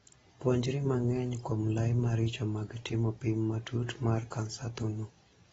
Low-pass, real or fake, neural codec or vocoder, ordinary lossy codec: 19.8 kHz; real; none; AAC, 24 kbps